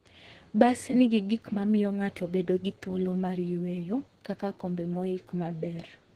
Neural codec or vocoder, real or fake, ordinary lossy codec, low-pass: codec, 32 kHz, 1.9 kbps, SNAC; fake; Opus, 16 kbps; 14.4 kHz